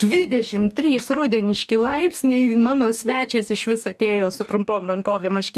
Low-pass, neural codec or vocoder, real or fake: 14.4 kHz; codec, 44.1 kHz, 2.6 kbps, DAC; fake